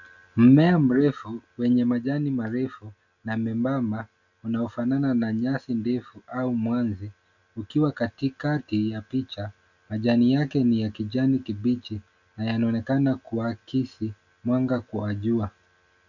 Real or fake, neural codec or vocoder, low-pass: real; none; 7.2 kHz